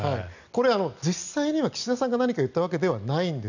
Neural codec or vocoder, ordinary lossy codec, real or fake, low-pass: none; none; real; 7.2 kHz